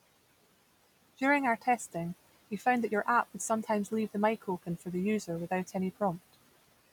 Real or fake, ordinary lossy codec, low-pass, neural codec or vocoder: real; none; 19.8 kHz; none